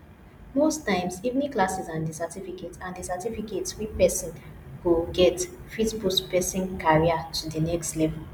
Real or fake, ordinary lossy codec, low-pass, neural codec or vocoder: real; none; none; none